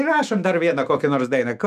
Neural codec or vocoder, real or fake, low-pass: autoencoder, 48 kHz, 128 numbers a frame, DAC-VAE, trained on Japanese speech; fake; 14.4 kHz